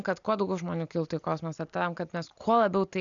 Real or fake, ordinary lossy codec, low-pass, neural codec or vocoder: real; MP3, 96 kbps; 7.2 kHz; none